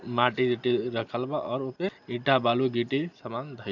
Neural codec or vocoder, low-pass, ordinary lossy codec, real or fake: none; 7.2 kHz; none; real